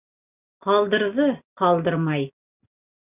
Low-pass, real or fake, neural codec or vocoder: 3.6 kHz; real; none